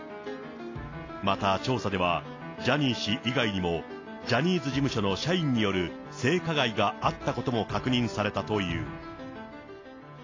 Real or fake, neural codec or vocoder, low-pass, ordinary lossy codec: real; none; 7.2 kHz; AAC, 32 kbps